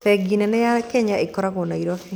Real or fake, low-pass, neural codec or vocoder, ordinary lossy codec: real; none; none; none